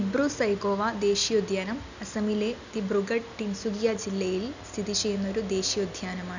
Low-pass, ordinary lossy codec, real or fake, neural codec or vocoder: 7.2 kHz; none; real; none